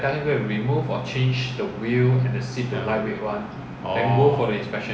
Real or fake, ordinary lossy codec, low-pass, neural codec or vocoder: real; none; none; none